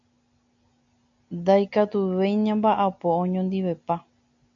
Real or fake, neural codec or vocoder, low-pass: real; none; 7.2 kHz